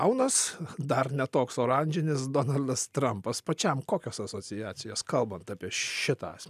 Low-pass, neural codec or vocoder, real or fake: 14.4 kHz; none; real